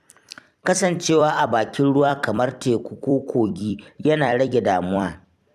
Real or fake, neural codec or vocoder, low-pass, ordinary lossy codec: fake; vocoder, 44.1 kHz, 128 mel bands every 256 samples, BigVGAN v2; 14.4 kHz; none